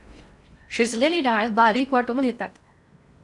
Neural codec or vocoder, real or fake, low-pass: codec, 16 kHz in and 24 kHz out, 0.6 kbps, FocalCodec, streaming, 4096 codes; fake; 10.8 kHz